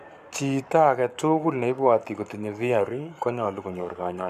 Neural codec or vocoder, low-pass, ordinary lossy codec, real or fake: codec, 44.1 kHz, 7.8 kbps, Pupu-Codec; 14.4 kHz; none; fake